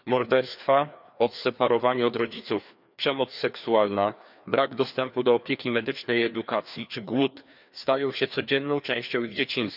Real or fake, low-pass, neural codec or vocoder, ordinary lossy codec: fake; 5.4 kHz; codec, 16 kHz, 2 kbps, FreqCodec, larger model; none